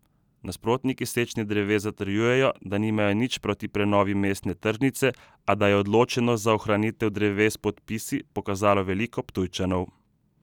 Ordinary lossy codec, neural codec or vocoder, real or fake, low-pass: none; none; real; 19.8 kHz